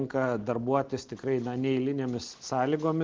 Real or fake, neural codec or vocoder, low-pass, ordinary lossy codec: real; none; 7.2 kHz; Opus, 16 kbps